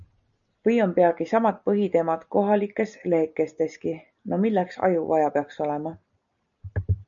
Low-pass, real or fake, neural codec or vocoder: 7.2 kHz; real; none